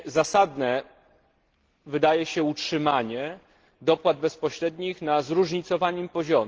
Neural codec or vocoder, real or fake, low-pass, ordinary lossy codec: none; real; 7.2 kHz; Opus, 16 kbps